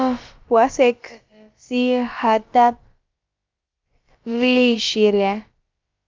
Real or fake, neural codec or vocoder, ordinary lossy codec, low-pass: fake; codec, 16 kHz, about 1 kbps, DyCAST, with the encoder's durations; Opus, 24 kbps; 7.2 kHz